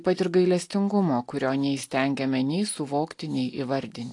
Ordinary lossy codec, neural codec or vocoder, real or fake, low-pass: AAC, 48 kbps; none; real; 10.8 kHz